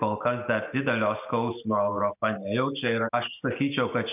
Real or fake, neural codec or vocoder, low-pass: real; none; 3.6 kHz